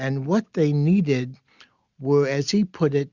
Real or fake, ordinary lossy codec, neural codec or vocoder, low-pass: real; Opus, 64 kbps; none; 7.2 kHz